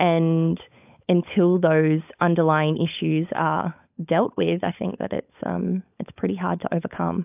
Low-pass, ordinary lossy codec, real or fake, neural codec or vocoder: 3.6 kHz; AAC, 32 kbps; fake; codec, 16 kHz, 16 kbps, FunCodec, trained on LibriTTS, 50 frames a second